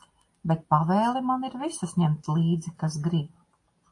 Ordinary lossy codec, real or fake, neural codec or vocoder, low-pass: AAC, 48 kbps; real; none; 10.8 kHz